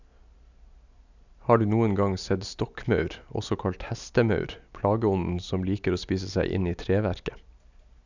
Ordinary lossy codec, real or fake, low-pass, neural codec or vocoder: none; real; 7.2 kHz; none